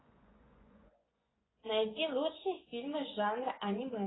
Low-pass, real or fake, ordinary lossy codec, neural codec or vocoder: 7.2 kHz; fake; AAC, 16 kbps; vocoder, 24 kHz, 100 mel bands, Vocos